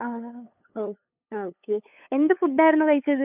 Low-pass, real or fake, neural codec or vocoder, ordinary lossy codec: 3.6 kHz; fake; codec, 16 kHz, 8 kbps, FunCodec, trained on LibriTTS, 25 frames a second; none